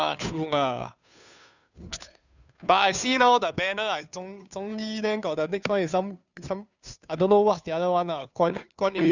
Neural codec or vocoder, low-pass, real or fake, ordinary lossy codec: codec, 16 kHz, 2 kbps, FunCodec, trained on Chinese and English, 25 frames a second; 7.2 kHz; fake; none